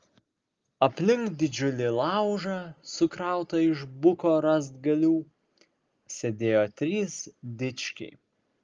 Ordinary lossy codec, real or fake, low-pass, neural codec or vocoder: Opus, 32 kbps; real; 7.2 kHz; none